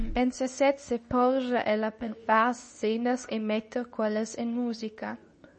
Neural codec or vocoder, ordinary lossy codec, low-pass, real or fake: codec, 24 kHz, 0.9 kbps, WavTokenizer, medium speech release version 2; MP3, 32 kbps; 10.8 kHz; fake